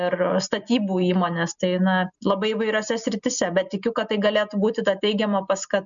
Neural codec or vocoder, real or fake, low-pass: none; real; 7.2 kHz